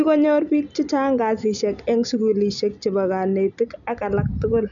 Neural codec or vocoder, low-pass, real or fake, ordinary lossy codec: none; 7.2 kHz; real; none